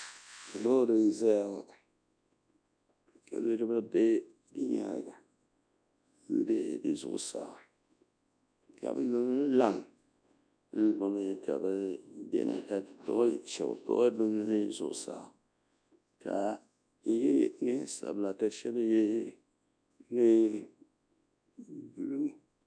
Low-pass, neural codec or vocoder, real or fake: 9.9 kHz; codec, 24 kHz, 0.9 kbps, WavTokenizer, large speech release; fake